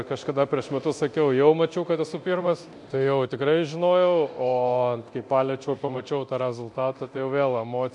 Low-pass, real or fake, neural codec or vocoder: 10.8 kHz; fake; codec, 24 kHz, 0.9 kbps, DualCodec